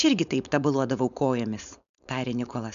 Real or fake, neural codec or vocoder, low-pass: fake; codec, 16 kHz, 4.8 kbps, FACodec; 7.2 kHz